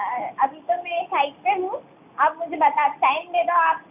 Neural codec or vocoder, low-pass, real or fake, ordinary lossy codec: none; 3.6 kHz; real; AAC, 32 kbps